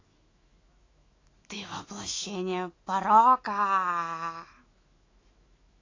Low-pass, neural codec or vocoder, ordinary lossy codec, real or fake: 7.2 kHz; autoencoder, 48 kHz, 128 numbers a frame, DAC-VAE, trained on Japanese speech; AAC, 32 kbps; fake